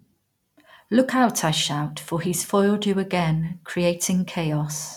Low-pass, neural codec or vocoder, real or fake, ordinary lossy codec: 19.8 kHz; none; real; none